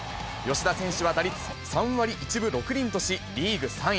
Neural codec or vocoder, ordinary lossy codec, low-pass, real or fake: none; none; none; real